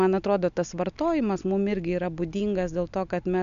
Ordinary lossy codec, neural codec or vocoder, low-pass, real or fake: MP3, 64 kbps; none; 7.2 kHz; real